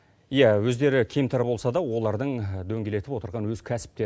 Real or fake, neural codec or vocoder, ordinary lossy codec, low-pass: real; none; none; none